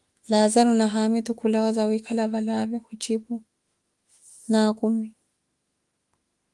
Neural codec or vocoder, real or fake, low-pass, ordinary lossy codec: autoencoder, 48 kHz, 32 numbers a frame, DAC-VAE, trained on Japanese speech; fake; 10.8 kHz; Opus, 24 kbps